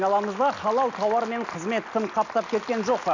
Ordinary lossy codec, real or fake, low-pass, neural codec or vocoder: none; real; 7.2 kHz; none